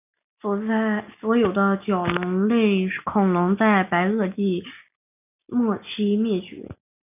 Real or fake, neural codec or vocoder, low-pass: real; none; 3.6 kHz